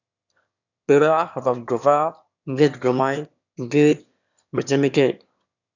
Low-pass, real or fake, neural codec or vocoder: 7.2 kHz; fake; autoencoder, 22.05 kHz, a latent of 192 numbers a frame, VITS, trained on one speaker